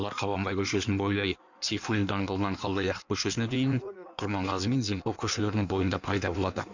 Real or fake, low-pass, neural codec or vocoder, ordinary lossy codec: fake; 7.2 kHz; codec, 16 kHz in and 24 kHz out, 1.1 kbps, FireRedTTS-2 codec; none